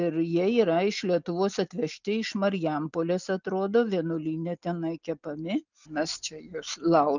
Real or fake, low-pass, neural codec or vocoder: real; 7.2 kHz; none